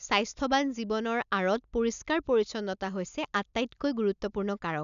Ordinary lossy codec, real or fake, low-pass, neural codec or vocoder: none; real; 7.2 kHz; none